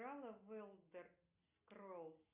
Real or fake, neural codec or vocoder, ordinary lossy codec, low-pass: real; none; Opus, 64 kbps; 3.6 kHz